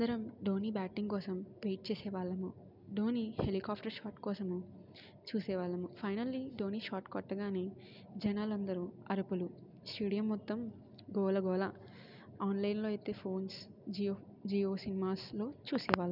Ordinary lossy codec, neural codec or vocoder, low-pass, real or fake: none; none; 5.4 kHz; real